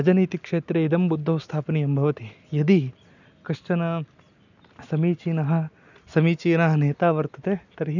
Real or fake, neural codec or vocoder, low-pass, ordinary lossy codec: real; none; 7.2 kHz; none